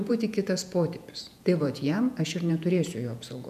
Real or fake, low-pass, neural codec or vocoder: fake; 14.4 kHz; vocoder, 48 kHz, 128 mel bands, Vocos